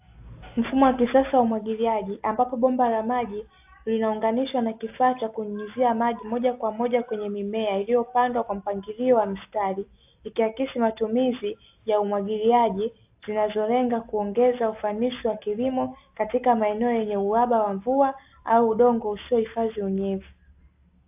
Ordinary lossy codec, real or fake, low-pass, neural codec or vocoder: AAC, 32 kbps; real; 3.6 kHz; none